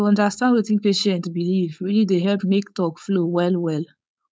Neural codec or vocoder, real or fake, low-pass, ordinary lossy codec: codec, 16 kHz, 4.8 kbps, FACodec; fake; none; none